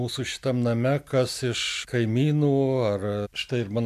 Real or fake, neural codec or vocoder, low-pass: real; none; 14.4 kHz